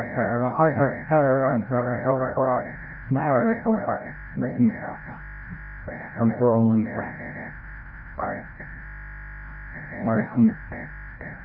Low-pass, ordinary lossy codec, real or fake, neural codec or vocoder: 5.4 kHz; none; fake; codec, 16 kHz, 0.5 kbps, FreqCodec, larger model